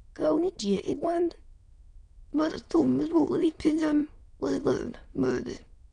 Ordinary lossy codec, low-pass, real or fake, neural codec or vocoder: none; 9.9 kHz; fake; autoencoder, 22.05 kHz, a latent of 192 numbers a frame, VITS, trained on many speakers